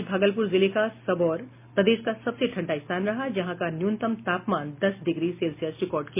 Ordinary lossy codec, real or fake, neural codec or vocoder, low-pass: MP3, 24 kbps; real; none; 3.6 kHz